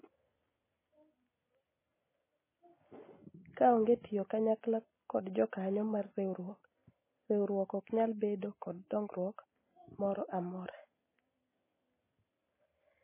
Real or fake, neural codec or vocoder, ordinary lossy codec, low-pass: real; none; MP3, 16 kbps; 3.6 kHz